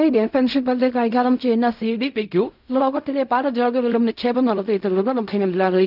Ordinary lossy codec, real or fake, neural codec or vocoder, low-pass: none; fake; codec, 16 kHz in and 24 kHz out, 0.4 kbps, LongCat-Audio-Codec, fine tuned four codebook decoder; 5.4 kHz